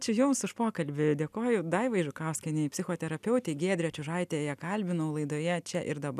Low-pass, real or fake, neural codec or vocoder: 14.4 kHz; real; none